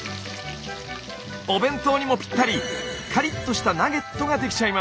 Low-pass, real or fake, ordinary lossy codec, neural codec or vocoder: none; real; none; none